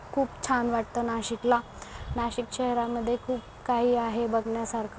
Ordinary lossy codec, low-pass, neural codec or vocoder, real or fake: none; none; none; real